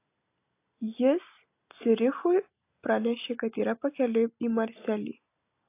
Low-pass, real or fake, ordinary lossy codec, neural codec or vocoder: 3.6 kHz; real; AAC, 24 kbps; none